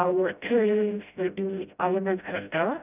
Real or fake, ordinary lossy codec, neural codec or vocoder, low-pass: fake; none; codec, 16 kHz, 0.5 kbps, FreqCodec, smaller model; 3.6 kHz